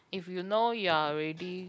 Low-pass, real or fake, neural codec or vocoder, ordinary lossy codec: none; real; none; none